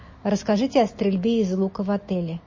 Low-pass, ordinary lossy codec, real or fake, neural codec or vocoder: 7.2 kHz; MP3, 32 kbps; real; none